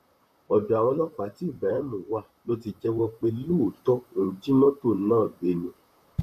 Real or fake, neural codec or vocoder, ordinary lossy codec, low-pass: fake; vocoder, 44.1 kHz, 128 mel bands, Pupu-Vocoder; none; 14.4 kHz